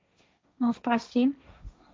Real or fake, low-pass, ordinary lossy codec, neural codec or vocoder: fake; none; none; codec, 16 kHz, 1.1 kbps, Voila-Tokenizer